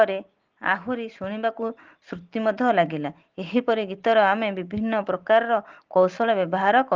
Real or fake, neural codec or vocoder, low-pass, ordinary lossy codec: real; none; 7.2 kHz; Opus, 16 kbps